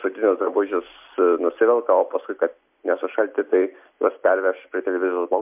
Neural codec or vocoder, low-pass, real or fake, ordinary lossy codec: none; 3.6 kHz; real; MP3, 32 kbps